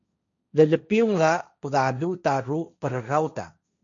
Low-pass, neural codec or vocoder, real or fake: 7.2 kHz; codec, 16 kHz, 1.1 kbps, Voila-Tokenizer; fake